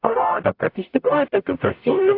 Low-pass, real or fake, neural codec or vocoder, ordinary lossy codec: 5.4 kHz; fake; codec, 44.1 kHz, 0.9 kbps, DAC; AAC, 32 kbps